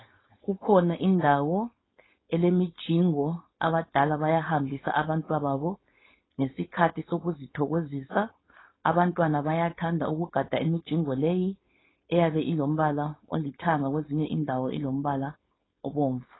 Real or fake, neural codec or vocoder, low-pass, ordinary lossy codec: fake; codec, 16 kHz, 4.8 kbps, FACodec; 7.2 kHz; AAC, 16 kbps